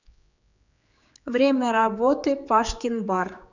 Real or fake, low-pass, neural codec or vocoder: fake; 7.2 kHz; codec, 16 kHz, 4 kbps, X-Codec, HuBERT features, trained on general audio